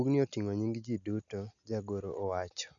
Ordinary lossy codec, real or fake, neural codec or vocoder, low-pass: none; real; none; 7.2 kHz